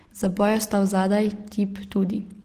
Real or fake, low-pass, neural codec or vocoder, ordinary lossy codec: real; 14.4 kHz; none; Opus, 16 kbps